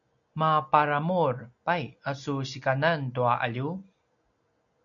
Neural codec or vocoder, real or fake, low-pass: none; real; 7.2 kHz